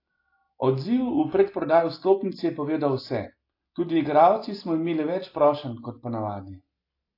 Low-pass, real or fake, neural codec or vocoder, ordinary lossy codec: 5.4 kHz; real; none; AAC, 32 kbps